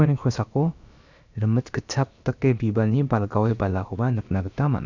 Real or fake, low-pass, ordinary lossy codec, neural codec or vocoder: fake; 7.2 kHz; none; codec, 16 kHz, about 1 kbps, DyCAST, with the encoder's durations